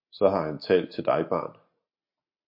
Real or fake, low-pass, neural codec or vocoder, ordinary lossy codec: real; 5.4 kHz; none; MP3, 32 kbps